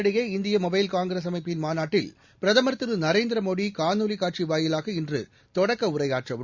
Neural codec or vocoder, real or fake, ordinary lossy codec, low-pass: none; real; Opus, 64 kbps; 7.2 kHz